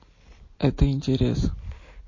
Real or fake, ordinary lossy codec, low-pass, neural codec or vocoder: real; MP3, 32 kbps; 7.2 kHz; none